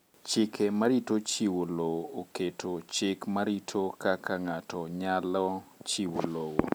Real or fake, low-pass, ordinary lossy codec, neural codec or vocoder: real; none; none; none